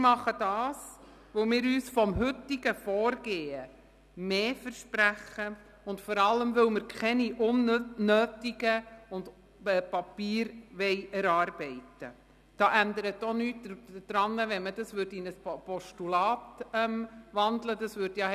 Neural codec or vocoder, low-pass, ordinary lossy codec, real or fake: none; 14.4 kHz; none; real